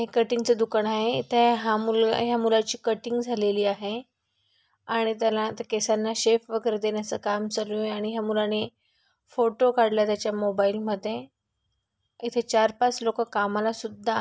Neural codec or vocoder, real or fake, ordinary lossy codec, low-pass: none; real; none; none